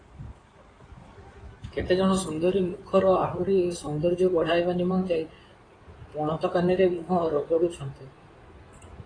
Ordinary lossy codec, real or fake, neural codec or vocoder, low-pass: AAC, 32 kbps; fake; codec, 16 kHz in and 24 kHz out, 2.2 kbps, FireRedTTS-2 codec; 9.9 kHz